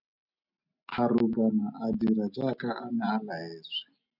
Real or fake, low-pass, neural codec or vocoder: real; 5.4 kHz; none